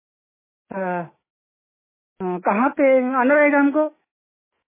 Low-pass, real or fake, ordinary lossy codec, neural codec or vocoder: 3.6 kHz; fake; MP3, 16 kbps; autoencoder, 48 kHz, 128 numbers a frame, DAC-VAE, trained on Japanese speech